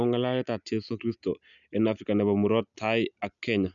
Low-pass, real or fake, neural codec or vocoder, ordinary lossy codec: 7.2 kHz; real; none; none